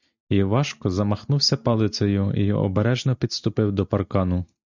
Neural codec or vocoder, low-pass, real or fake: none; 7.2 kHz; real